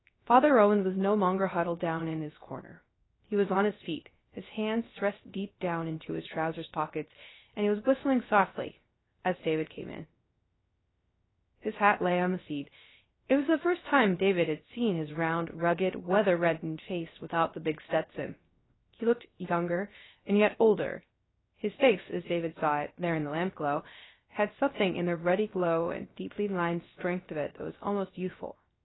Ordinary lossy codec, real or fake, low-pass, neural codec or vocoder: AAC, 16 kbps; fake; 7.2 kHz; codec, 16 kHz, 0.3 kbps, FocalCodec